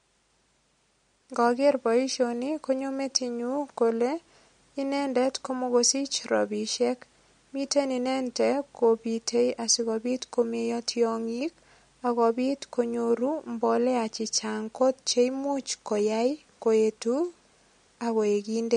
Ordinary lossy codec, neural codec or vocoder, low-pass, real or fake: MP3, 48 kbps; none; 9.9 kHz; real